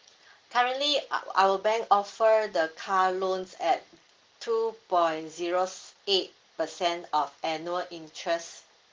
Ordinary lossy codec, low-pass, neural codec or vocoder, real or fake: Opus, 16 kbps; 7.2 kHz; none; real